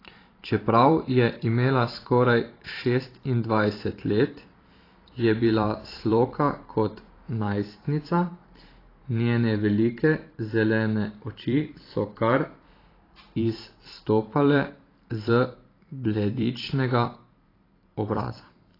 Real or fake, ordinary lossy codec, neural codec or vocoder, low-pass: fake; AAC, 24 kbps; vocoder, 44.1 kHz, 128 mel bands every 512 samples, BigVGAN v2; 5.4 kHz